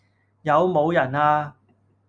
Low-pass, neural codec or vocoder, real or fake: 9.9 kHz; none; real